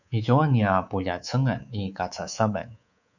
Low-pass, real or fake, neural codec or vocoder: 7.2 kHz; fake; codec, 24 kHz, 3.1 kbps, DualCodec